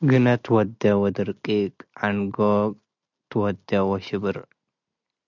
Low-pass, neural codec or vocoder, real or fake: 7.2 kHz; none; real